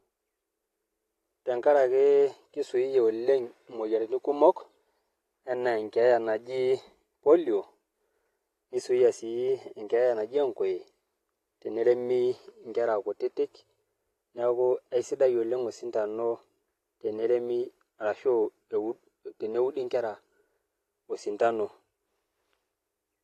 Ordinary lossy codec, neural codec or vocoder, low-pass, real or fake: AAC, 48 kbps; none; 14.4 kHz; real